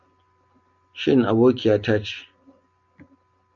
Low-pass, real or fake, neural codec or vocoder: 7.2 kHz; real; none